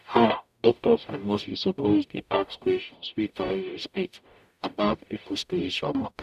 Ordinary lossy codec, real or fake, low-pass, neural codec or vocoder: none; fake; 14.4 kHz; codec, 44.1 kHz, 0.9 kbps, DAC